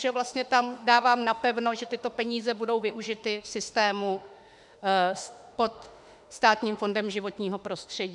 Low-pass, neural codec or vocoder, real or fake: 10.8 kHz; autoencoder, 48 kHz, 32 numbers a frame, DAC-VAE, trained on Japanese speech; fake